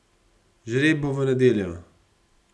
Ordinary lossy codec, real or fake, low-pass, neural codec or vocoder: none; real; none; none